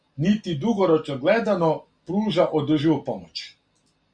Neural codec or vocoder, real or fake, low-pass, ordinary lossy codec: none; real; 9.9 kHz; Opus, 64 kbps